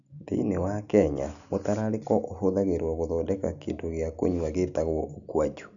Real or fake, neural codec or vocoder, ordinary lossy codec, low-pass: real; none; none; 7.2 kHz